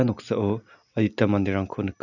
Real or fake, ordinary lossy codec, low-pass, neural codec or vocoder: real; none; 7.2 kHz; none